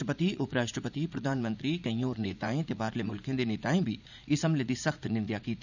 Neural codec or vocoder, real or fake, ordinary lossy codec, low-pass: vocoder, 44.1 kHz, 80 mel bands, Vocos; fake; none; 7.2 kHz